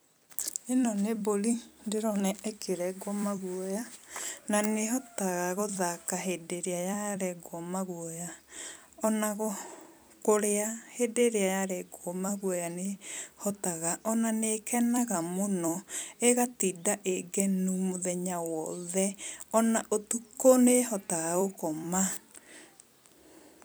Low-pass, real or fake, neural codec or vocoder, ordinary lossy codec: none; fake; vocoder, 44.1 kHz, 128 mel bands every 256 samples, BigVGAN v2; none